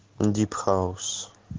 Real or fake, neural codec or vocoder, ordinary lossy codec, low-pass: real; none; Opus, 16 kbps; 7.2 kHz